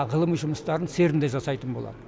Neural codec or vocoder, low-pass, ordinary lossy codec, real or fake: none; none; none; real